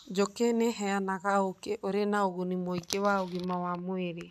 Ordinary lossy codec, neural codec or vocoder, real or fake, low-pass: none; none; real; 14.4 kHz